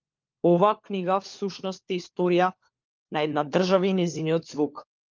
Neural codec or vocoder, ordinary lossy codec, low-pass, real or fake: codec, 16 kHz, 4 kbps, FunCodec, trained on LibriTTS, 50 frames a second; Opus, 24 kbps; 7.2 kHz; fake